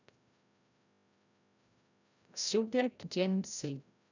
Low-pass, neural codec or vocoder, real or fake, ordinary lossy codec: 7.2 kHz; codec, 16 kHz, 0.5 kbps, FreqCodec, larger model; fake; none